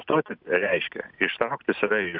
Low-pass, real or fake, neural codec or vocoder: 5.4 kHz; real; none